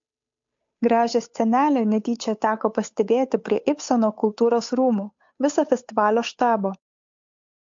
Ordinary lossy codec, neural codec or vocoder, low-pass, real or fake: MP3, 48 kbps; codec, 16 kHz, 8 kbps, FunCodec, trained on Chinese and English, 25 frames a second; 7.2 kHz; fake